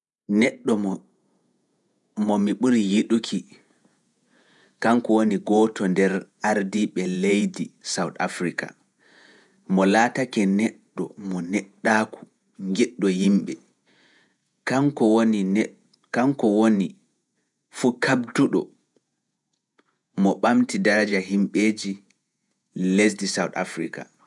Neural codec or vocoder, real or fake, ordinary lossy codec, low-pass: vocoder, 48 kHz, 128 mel bands, Vocos; fake; none; 10.8 kHz